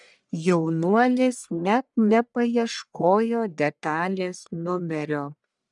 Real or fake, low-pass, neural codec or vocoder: fake; 10.8 kHz; codec, 44.1 kHz, 1.7 kbps, Pupu-Codec